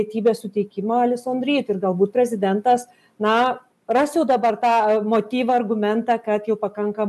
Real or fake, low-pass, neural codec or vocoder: real; 14.4 kHz; none